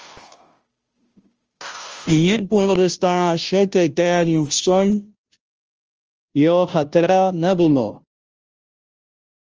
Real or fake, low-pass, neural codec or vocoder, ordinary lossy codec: fake; 7.2 kHz; codec, 16 kHz, 0.5 kbps, FunCodec, trained on Chinese and English, 25 frames a second; Opus, 24 kbps